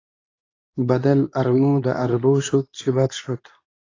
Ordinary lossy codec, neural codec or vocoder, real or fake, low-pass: AAC, 32 kbps; codec, 24 kHz, 0.9 kbps, WavTokenizer, medium speech release version 2; fake; 7.2 kHz